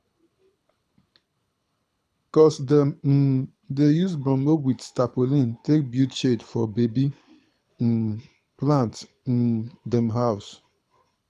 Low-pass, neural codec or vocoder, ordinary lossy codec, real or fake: none; codec, 24 kHz, 6 kbps, HILCodec; none; fake